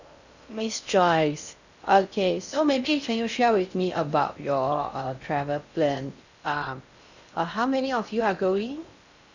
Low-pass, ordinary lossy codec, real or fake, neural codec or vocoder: 7.2 kHz; none; fake; codec, 16 kHz in and 24 kHz out, 0.6 kbps, FocalCodec, streaming, 4096 codes